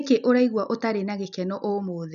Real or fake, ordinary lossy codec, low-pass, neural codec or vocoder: real; none; 7.2 kHz; none